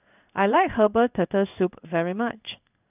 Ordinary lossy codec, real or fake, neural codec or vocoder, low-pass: none; fake; codec, 16 kHz in and 24 kHz out, 1 kbps, XY-Tokenizer; 3.6 kHz